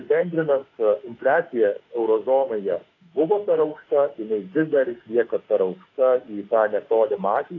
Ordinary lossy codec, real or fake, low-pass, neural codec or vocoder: AAC, 32 kbps; fake; 7.2 kHz; autoencoder, 48 kHz, 32 numbers a frame, DAC-VAE, trained on Japanese speech